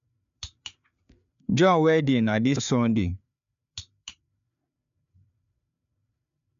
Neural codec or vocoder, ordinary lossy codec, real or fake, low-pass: codec, 16 kHz, 4 kbps, FreqCodec, larger model; MP3, 64 kbps; fake; 7.2 kHz